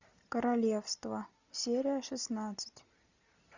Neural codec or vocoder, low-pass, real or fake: none; 7.2 kHz; real